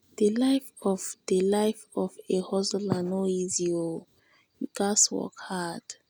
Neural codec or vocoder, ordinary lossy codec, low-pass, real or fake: none; none; none; real